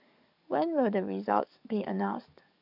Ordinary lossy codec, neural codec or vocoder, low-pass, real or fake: none; codec, 44.1 kHz, 7.8 kbps, DAC; 5.4 kHz; fake